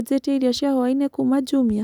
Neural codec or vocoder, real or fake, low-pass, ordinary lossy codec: none; real; 19.8 kHz; none